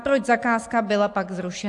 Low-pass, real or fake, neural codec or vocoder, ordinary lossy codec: 10.8 kHz; real; none; AAC, 64 kbps